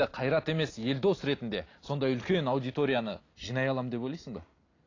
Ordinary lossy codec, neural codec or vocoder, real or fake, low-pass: AAC, 32 kbps; none; real; 7.2 kHz